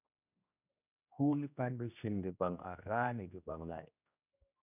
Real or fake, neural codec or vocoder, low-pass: fake; codec, 16 kHz, 1 kbps, X-Codec, HuBERT features, trained on general audio; 3.6 kHz